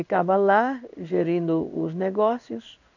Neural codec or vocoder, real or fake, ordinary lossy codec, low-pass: codec, 16 kHz in and 24 kHz out, 1 kbps, XY-Tokenizer; fake; none; 7.2 kHz